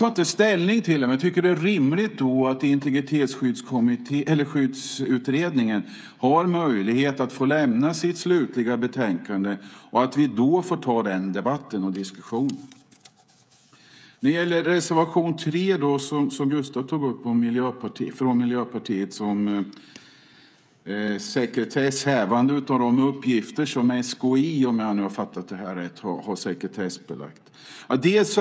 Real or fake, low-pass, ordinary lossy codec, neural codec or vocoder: fake; none; none; codec, 16 kHz, 16 kbps, FreqCodec, smaller model